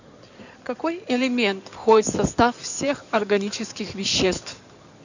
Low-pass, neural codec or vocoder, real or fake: 7.2 kHz; codec, 16 kHz in and 24 kHz out, 2.2 kbps, FireRedTTS-2 codec; fake